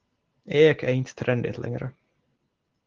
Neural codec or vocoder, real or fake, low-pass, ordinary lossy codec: none; real; 7.2 kHz; Opus, 16 kbps